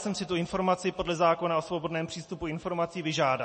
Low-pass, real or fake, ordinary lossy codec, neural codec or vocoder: 10.8 kHz; real; MP3, 32 kbps; none